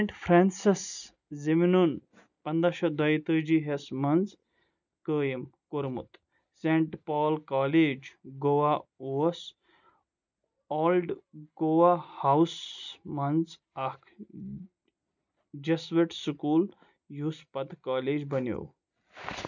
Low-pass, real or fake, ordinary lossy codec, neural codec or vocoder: 7.2 kHz; real; none; none